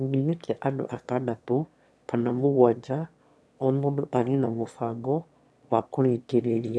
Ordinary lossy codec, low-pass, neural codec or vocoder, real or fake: none; none; autoencoder, 22.05 kHz, a latent of 192 numbers a frame, VITS, trained on one speaker; fake